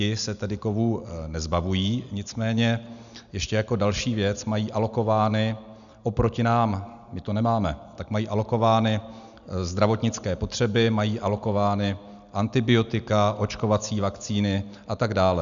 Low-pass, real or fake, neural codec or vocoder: 7.2 kHz; real; none